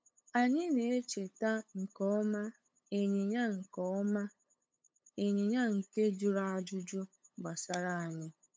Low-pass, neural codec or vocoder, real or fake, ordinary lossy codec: none; codec, 16 kHz, 8 kbps, FunCodec, trained on LibriTTS, 25 frames a second; fake; none